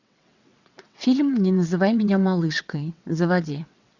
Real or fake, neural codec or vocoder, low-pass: fake; vocoder, 22.05 kHz, 80 mel bands, WaveNeXt; 7.2 kHz